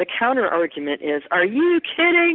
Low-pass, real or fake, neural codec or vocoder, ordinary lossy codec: 5.4 kHz; real; none; Opus, 32 kbps